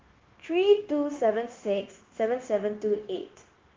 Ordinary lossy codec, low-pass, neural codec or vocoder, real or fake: Opus, 16 kbps; 7.2 kHz; codec, 16 kHz, 0.9 kbps, LongCat-Audio-Codec; fake